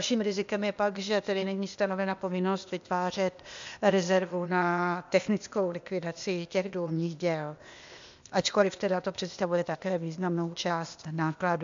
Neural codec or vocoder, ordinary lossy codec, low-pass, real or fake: codec, 16 kHz, 0.8 kbps, ZipCodec; MP3, 64 kbps; 7.2 kHz; fake